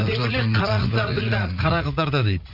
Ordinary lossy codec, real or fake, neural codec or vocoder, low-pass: none; fake; vocoder, 44.1 kHz, 80 mel bands, Vocos; 5.4 kHz